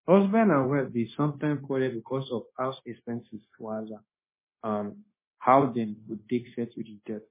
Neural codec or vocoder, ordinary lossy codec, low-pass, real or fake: codec, 16 kHz, 0.9 kbps, LongCat-Audio-Codec; MP3, 16 kbps; 3.6 kHz; fake